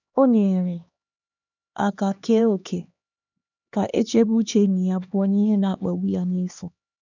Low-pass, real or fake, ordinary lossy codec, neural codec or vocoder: 7.2 kHz; fake; none; codec, 16 kHz, 2 kbps, X-Codec, HuBERT features, trained on LibriSpeech